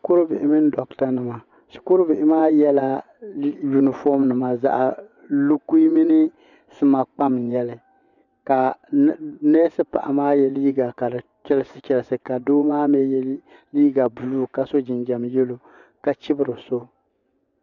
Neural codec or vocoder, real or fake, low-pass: vocoder, 24 kHz, 100 mel bands, Vocos; fake; 7.2 kHz